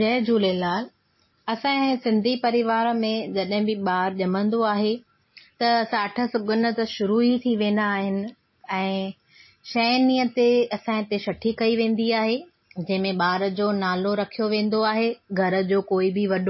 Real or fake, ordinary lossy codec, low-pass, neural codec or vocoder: real; MP3, 24 kbps; 7.2 kHz; none